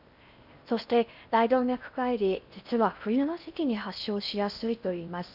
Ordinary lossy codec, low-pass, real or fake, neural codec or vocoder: none; 5.4 kHz; fake; codec, 16 kHz in and 24 kHz out, 0.6 kbps, FocalCodec, streaming, 4096 codes